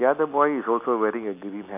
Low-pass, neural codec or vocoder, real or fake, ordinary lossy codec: 3.6 kHz; none; real; none